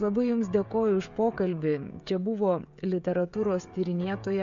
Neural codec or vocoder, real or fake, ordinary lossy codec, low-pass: codec, 16 kHz, 16 kbps, FreqCodec, smaller model; fake; MP3, 64 kbps; 7.2 kHz